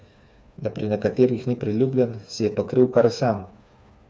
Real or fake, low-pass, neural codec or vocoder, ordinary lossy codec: fake; none; codec, 16 kHz, 4 kbps, FreqCodec, smaller model; none